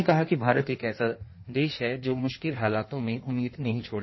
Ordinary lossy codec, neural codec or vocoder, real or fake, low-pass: MP3, 24 kbps; codec, 16 kHz in and 24 kHz out, 1.1 kbps, FireRedTTS-2 codec; fake; 7.2 kHz